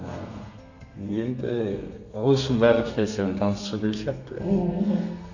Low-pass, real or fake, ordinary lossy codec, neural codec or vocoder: 7.2 kHz; fake; none; codec, 32 kHz, 1.9 kbps, SNAC